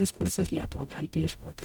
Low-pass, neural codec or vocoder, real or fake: 19.8 kHz; codec, 44.1 kHz, 0.9 kbps, DAC; fake